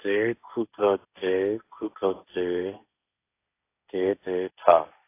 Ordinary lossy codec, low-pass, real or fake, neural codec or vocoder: AAC, 24 kbps; 3.6 kHz; fake; codec, 16 kHz, 1.1 kbps, Voila-Tokenizer